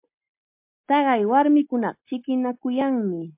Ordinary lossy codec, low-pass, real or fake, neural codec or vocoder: MP3, 32 kbps; 3.6 kHz; real; none